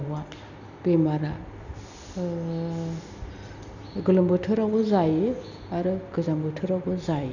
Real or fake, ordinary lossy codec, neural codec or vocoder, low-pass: real; none; none; 7.2 kHz